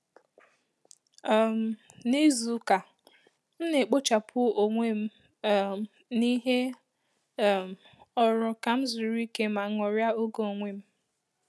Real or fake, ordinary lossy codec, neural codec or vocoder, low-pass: real; none; none; none